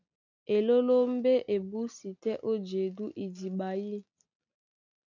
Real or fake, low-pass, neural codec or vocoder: real; 7.2 kHz; none